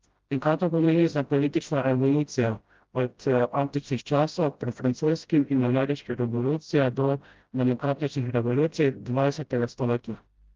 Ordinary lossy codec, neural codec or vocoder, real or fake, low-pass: Opus, 32 kbps; codec, 16 kHz, 0.5 kbps, FreqCodec, smaller model; fake; 7.2 kHz